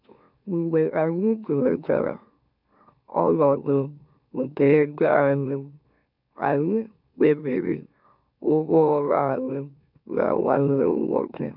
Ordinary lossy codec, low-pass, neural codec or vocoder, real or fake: none; 5.4 kHz; autoencoder, 44.1 kHz, a latent of 192 numbers a frame, MeloTTS; fake